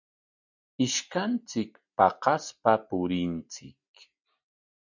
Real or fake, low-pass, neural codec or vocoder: real; 7.2 kHz; none